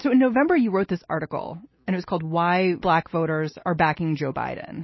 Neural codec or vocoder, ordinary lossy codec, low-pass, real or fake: none; MP3, 24 kbps; 7.2 kHz; real